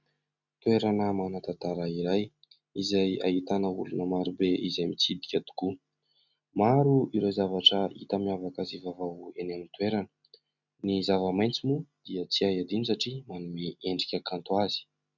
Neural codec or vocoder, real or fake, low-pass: none; real; 7.2 kHz